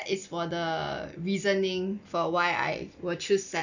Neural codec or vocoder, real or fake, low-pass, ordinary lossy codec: none; real; 7.2 kHz; none